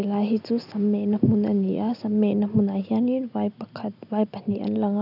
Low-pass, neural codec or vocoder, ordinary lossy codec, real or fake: 5.4 kHz; none; none; real